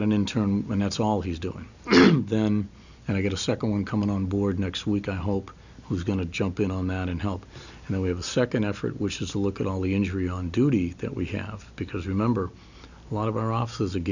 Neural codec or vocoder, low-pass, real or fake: none; 7.2 kHz; real